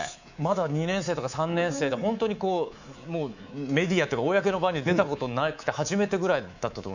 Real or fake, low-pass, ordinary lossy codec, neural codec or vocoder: fake; 7.2 kHz; none; codec, 24 kHz, 3.1 kbps, DualCodec